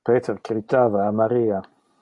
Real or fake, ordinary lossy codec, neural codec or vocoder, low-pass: real; MP3, 64 kbps; none; 10.8 kHz